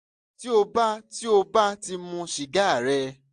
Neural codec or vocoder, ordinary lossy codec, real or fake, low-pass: none; AAC, 64 kbps; real; 10.8 kHz